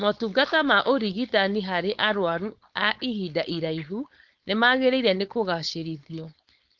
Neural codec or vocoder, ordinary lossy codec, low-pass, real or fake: codec, 16 kHz, 4.8 kbps, FACodec; Opus, 24 kbps; 7.2 kHz; fake